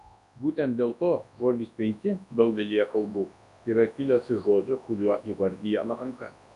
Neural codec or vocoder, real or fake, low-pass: codec, 24 kHz, 0.9 kbps, WavTokenizer, large speech release; fake; 10.8 kHz